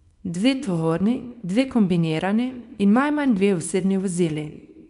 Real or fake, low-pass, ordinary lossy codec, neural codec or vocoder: fake; 10.8 kHz; none; codec, 24 kHz, 0.9 kbps, WavTokenizer, small release